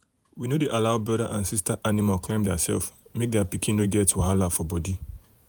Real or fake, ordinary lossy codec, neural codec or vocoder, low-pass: fake; none; vocoder, 48 kHz, 128 mel bands, Vocos; none